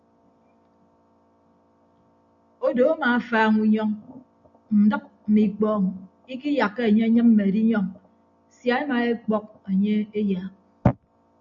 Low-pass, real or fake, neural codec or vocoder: 7.2 kHz; real; none